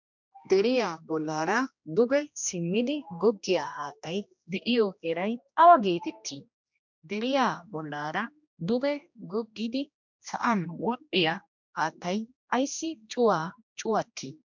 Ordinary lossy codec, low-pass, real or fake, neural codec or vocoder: MP3, 64 kbps; 7.2 kHz; fake; codec, 16 kHz, 1 kbps, X-Codec, HuBERT features, trained on general audio